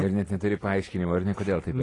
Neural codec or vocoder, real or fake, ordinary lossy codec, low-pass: none; real; AAC, 32 kbps; 10.8 kHz